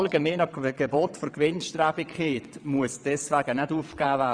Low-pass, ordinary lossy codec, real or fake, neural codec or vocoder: 9.9 kHz; none; fake; vocoder, 22.05 kHz, 80 mel bands, WaveNeXt